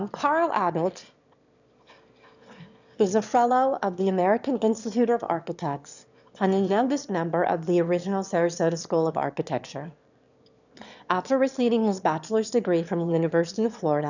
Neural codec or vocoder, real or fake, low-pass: autoencoder, 22.05 kHz, a latent of 192 numbers a frame, VITS, trained on one speaker; fake; 7.2 kHz